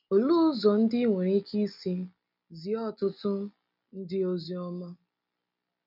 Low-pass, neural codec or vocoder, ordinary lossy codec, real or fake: 5.4 kHz; none; none; real